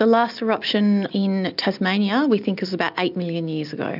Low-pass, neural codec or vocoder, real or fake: 5.4 kHz; none; real